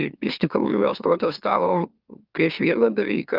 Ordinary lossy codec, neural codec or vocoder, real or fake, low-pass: Opus, 24 kbps; autoencoder, 44.1 kHz, a latent of 192 numbers a frame, MeloTTS; fake; 5.4 kHz